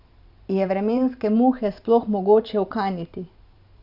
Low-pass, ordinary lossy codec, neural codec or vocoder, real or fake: 5.4 kHz; none; vocoder, 44.1 kHz, 128 mel bands every 512 samples, BigVGAN v2; fake